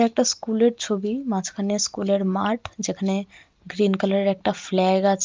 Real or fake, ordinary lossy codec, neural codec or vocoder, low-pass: real; Opus, 24 kbps; none; 7.2 kHz